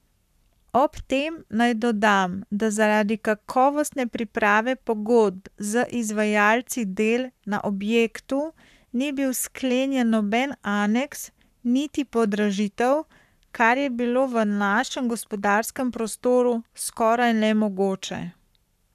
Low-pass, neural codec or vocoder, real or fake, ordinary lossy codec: 14.4 kHz; codec, 44.1 kHz, 7.8 kbps, Pupu-Codec; fake; none